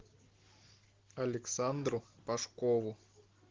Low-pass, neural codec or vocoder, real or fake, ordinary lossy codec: 7.2 kHz; none; real; Opus, 16 kbps